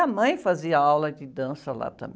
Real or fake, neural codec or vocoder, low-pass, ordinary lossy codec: real; none; none; none